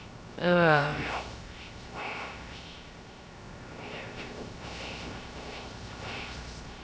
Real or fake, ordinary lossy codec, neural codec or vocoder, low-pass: fake; none; codec, 16 kHz, 0.3 kbps, FocalCodec; none